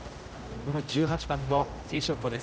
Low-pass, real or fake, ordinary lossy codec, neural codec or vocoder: none; fake; none; codec, 16 kHz, 0.5 kbps, X-Codec, HuBERT features, trained on general audio